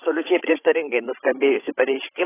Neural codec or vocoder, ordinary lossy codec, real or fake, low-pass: codec, 16 kHz, 16 kbps, FreqCodec, larger model; AAC, 16 kbps; fake; 3.6 kHz